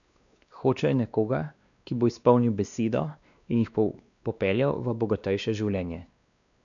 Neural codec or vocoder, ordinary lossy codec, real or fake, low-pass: codec, 16 kHz, 2 kbps, X-Codec, WavLM features, trained on Multilingual LibriSpeech; none; fake; 7.2 kHz